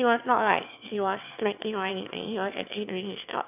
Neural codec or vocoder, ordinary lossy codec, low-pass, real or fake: autoencoder, 22.05 kHz, a latent of 192 numbers a frame, VITS, trained on one speaker; none; 3.6 kHz; fake